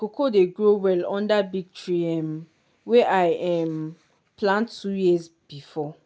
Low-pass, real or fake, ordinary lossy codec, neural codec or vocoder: none; real; none; none